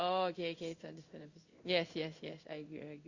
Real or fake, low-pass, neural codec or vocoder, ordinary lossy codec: fake; 7.2 kHz; codec, 16 kHz in and 24 kHz out, 1 kbps, XY-Tokenizer; Opus, 64 kbps